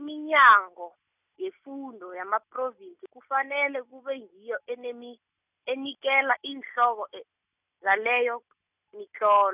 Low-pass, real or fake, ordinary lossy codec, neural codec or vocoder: 3.6 kHz; real; none; none